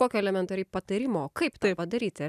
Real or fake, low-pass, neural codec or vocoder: real; 14.4 kHz; none